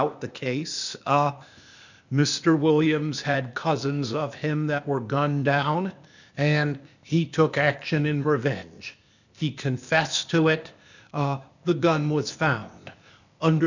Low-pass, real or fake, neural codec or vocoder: 7.2 kHz; fake; codec, 16 kHz, 0.8 kbps, ZipCodec